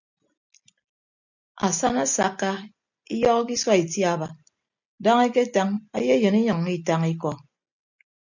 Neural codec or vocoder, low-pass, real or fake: none; 7.2 kHz; real